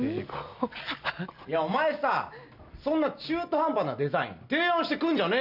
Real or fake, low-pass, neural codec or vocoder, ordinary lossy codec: real; 5.4 kHz; none; none